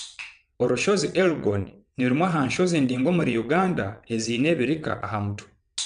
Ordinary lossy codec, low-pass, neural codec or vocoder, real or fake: AAC, 96 kbps; 9.9 kHz; vocoder, 22.05 kHz, 80 mel bands, WaveNeXt; fake